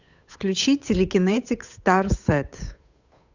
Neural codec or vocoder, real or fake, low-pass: codec, 16 kHz, 8 kbps, FunCodec, trained on Chinese and English, 25 frames a second; fake; 7.2 kHz